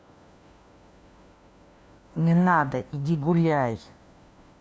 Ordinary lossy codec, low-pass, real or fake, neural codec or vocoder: none; none; fake; codec, 16 kHz, 1 kbps, FunCodec, trained on LibriTTS, 50 frames a second